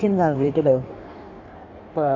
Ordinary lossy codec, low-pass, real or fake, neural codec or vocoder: AAC, 48 kbps; 7.2 kHz; fake; codec, 16 kHz in and 24 kHz out, 1.1 kbps, FireRedTTS-2 codec